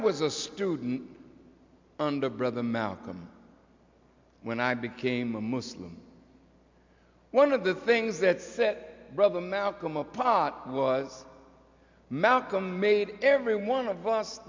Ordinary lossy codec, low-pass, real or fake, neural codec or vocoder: MP3, 64 kbps; 7.2 kHz; real; none